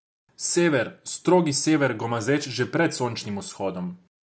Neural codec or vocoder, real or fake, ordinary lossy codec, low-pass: none; real; none; none